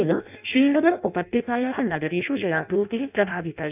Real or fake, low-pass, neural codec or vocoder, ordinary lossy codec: fake; 3.6 kHz; codec, 16 kHz in and 24 kHz out, 0.6 kbps, FireRedTTS-2 codec; none